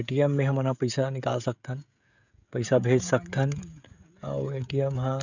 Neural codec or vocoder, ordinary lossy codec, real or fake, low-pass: codec, 16 kHz, 16 kbps, FreqCodec, smaller model; none; fake; 7.2 kHz